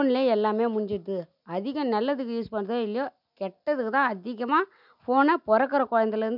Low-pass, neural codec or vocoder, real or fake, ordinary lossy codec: 5.4 kHz; none; real; none